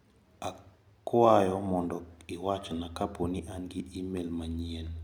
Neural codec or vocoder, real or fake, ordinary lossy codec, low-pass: none; real; none; 19.8 kHz